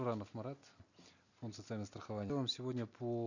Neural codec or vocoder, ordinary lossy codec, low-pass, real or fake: none; none; 7.2 kHz; real